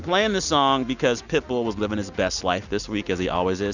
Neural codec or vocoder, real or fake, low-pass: none; real; 7.2 kHz